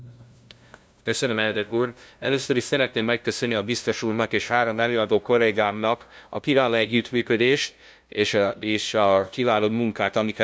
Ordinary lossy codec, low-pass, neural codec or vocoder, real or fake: none; none; codec, 16 kHz, 0.5 kbps, FunCodec, trained on LibriTTS, 25 frames a second; fake